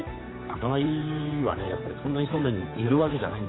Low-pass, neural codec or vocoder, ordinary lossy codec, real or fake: 7.2 kHz; codec, 16 kHz, 4 kbps, X-Codec, HuBERT features, trained on general audio; AAC, 16 kbps; fake